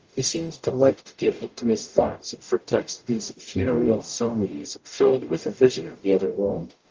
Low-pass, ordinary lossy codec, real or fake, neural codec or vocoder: 7.2 kHz; Opus, 24 kbps; fake; codec, 44.1 kHz, 0.9 kbps, DAC